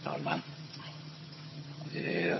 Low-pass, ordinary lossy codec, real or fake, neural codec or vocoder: 7.2 kHz; MP3, 24 kbps; fake; vocoder, 22.05 kHz, 80 mel bands, HiFi-GAN